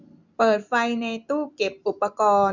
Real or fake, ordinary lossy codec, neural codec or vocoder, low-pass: real; none; none; 7.2 kHz